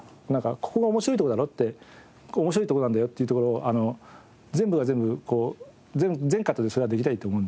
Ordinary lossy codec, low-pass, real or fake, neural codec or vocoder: none; none; real; none